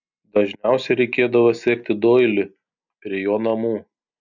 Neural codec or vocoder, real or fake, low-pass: none; real; 7.2 kHz